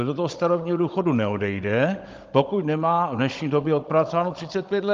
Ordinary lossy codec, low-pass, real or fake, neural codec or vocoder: Opus, 32 kbps; 7.2 kHz; fake; codec, 16 kHz, 16 kbps, FunCodec, trained on Chinese and English, 50 frames a second